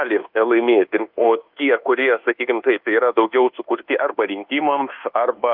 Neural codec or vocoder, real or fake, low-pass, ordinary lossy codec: codec, 24 kHz, 1.2 kbps, DualCodec; fake; 5.4 kHz; Opus, 24 kbps